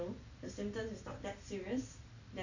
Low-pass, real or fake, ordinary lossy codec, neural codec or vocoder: 7.2 kHz; real; none; none